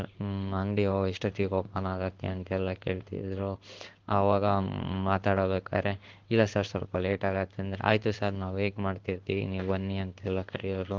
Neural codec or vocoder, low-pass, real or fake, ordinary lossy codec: codec, 16 kHz in and 24 kHz out, 1 kbps, XY-Tokenizer; 7.2 kHz; fake; Opus, 32 kbps